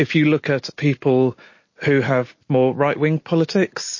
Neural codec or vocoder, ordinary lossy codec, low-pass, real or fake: none; MP3, 32 kbps; 7.2 kHz; real